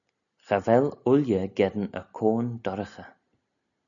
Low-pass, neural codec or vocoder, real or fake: 7.2 kHz; none; real